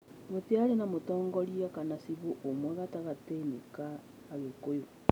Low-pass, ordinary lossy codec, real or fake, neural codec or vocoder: none; none; real; none